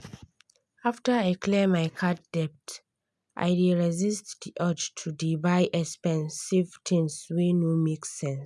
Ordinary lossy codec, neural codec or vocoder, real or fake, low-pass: none; none; real; none